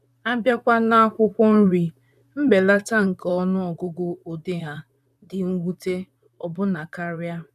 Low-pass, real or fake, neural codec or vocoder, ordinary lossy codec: 14.4 kHz; fake; vocoder, 44.1 kHz, 128 mel bands, Pupu-Vocoder; none